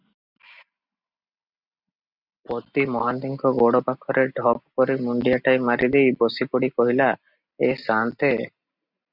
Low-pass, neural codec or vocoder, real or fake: 5.4 kHz; none; real